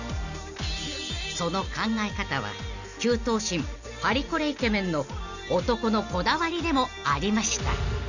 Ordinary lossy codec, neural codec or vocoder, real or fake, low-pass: none; none; real; 7.2 kHz